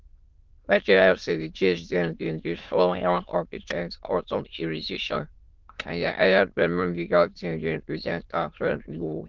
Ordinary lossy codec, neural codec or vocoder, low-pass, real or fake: Opus, 32 kbps; autoencoder, 22.05 kHz, a latent of 192 numbers a frame, VITS, trained on many speakers; 7.2 kHz; fake